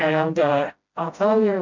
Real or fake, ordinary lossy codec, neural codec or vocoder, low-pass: fake; MP3, 64 kbps; codec, 16 kHz, 0.5 kbps, FreqCodec, smaller model; 7.2 kHz